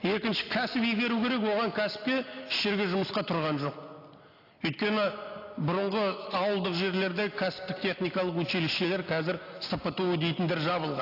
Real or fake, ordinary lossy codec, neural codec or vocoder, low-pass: real; AAC, 32 kbps; none; 5.4 kHz